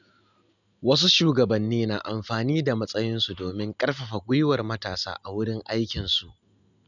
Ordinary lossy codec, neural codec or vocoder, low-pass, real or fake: none; none; 7.2 kHz; real